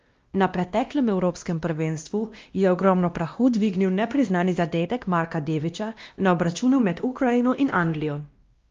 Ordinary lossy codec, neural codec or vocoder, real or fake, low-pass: Opus, 32 kbps; codec, 16 kHz, 1 kbps, X-Codec, WavLM features, trained on Multilingual LibriSpeech; fake; 7.2 kHz